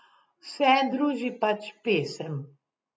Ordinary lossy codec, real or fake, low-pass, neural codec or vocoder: none; real; none; none